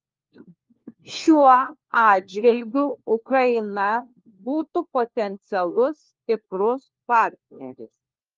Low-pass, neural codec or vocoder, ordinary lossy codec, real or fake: 7.2 kHz; codec, 16 kHz, 1 kbps, FunCodec, trained on LibriTTS, 50 frames a second; Opus, 24 kbps; fake